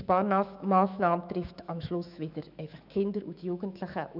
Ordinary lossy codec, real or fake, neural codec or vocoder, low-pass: none; fake; autoencoder, 48 kHz, 128 numbers a frame, DAC-VAE, trained on Japanese speech; 5.4 kHz